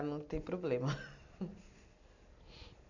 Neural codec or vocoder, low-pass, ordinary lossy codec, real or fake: none; 7.2 kHz; none; real